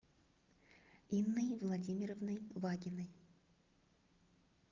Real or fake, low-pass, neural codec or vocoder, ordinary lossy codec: real; 7.2 kHz; none; Opus, 32 kbps